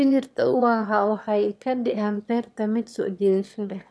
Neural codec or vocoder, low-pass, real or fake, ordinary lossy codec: autoencoder, 22.05 kHz, a latent of 192 numbers a frame, VITS, trained on one speaker; none; fake; none